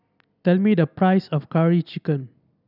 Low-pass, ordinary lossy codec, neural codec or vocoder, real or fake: 5.4 kHz; none; none; real